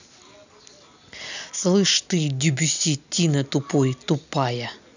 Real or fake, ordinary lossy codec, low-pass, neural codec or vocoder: real; none; 7.2 kHz; none